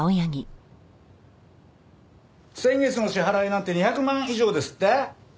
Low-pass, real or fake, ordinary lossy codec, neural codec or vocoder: none; real; none; none